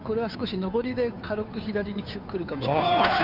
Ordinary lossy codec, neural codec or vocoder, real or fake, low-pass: none; codec, 16 kHz, 2 kbps, FunCodec, trained on Chinese and English, 25 frames a second; fake; 5.4 kHz